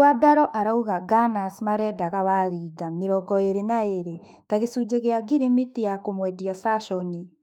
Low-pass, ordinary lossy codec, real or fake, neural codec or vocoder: 19.8 kHz; none; fake; autoencoder, 48 kHz, 32 numbers a frame, DAC-VAE, trained on Japanese speech